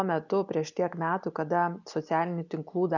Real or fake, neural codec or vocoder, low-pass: real; none; 7.2 kHz